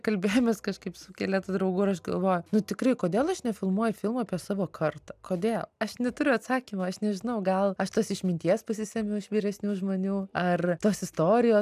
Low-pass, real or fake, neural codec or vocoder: 14.4 kHz; real; none